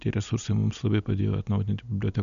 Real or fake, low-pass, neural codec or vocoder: real; 7.2 kHz; none